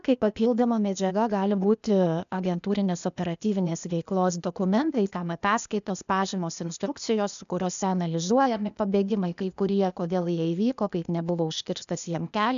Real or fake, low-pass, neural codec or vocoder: fake; 7.2 kHz; codec, 16 kHz, 0.8 kbps, ZipCodec